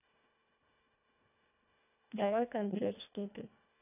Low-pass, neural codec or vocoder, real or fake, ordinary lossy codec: 3.6 kHz; codec, 24 kHz, 1.5 kbps, HILCodec; fake; none